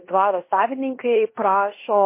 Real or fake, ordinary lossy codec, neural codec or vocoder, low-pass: fake; MP3, 24 kbps; codec, 16 kHz in and 24 kHz out, 0.9 kbps, LongCat-Audio-Codec, fine tuned four codebook decoder; 3.6 kHz